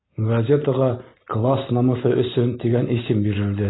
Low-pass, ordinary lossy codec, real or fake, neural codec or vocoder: 7.2 kHz; AAC, 16 kbps; real; none